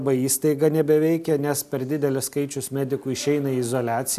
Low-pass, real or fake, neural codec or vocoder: 14.4 kHz; real; none